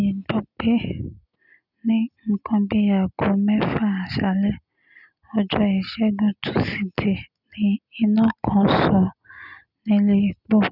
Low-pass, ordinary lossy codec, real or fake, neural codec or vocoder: 5.4 kHz; none; real; none